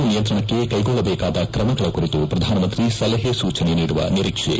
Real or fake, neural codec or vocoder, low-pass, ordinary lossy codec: real; none; none; none